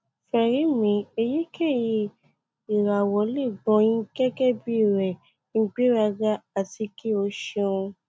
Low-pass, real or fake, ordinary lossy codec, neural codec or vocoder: none; real; none; none